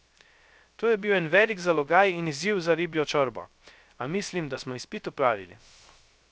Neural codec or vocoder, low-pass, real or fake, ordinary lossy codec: codec, 16 kHz, 0.3 kbps, FocalCodec; none; fake; none